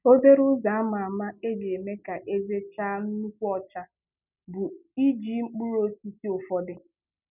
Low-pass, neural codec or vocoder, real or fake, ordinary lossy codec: 3.6 kHz; none; real; none